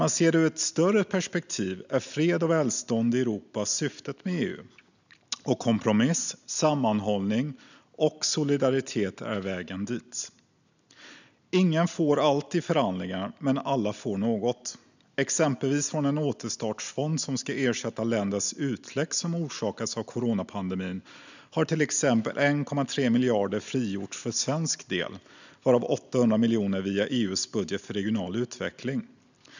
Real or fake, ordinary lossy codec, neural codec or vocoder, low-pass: real; none; none; 7.2 kHz